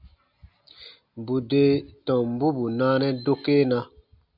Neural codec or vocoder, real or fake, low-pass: none; real; 5.4 kHz